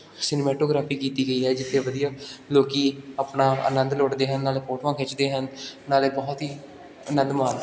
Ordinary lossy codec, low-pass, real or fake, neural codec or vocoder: none; none; real; none